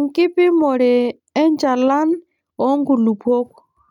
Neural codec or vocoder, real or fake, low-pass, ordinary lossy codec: none; real; 19.8 kHz; none